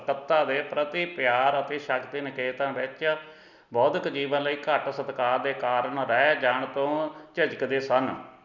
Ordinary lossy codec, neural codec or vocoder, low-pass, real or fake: none; none; 7.2 kHz; real